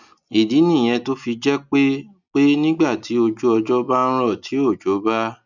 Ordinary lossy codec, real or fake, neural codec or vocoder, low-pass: none; real; none; 7.2 kHz